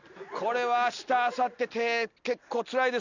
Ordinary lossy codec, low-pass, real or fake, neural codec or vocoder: none; 7.2 kHz; real; none